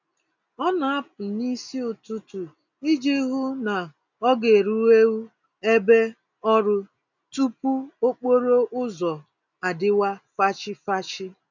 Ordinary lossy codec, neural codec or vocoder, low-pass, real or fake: none; none; 7.2 kHz; real